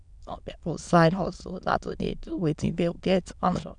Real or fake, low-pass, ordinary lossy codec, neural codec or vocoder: fake; 9.9 kHz; none; autoencoder, 22.05 kHz, a latent of 192 numbers a frame, VITS, trained on many speakers